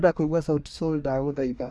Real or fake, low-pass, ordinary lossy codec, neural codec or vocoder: fake; none; none; codec, 24 kHz, 1 kbps, SNAC